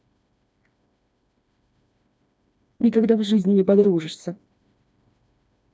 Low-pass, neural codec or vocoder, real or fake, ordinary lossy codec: none; codec, 16 kHz, 2 kbps, FreqCodec, smaller model; fake; none